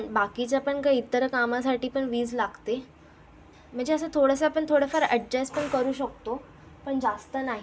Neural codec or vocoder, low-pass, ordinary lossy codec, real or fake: none; none; none; real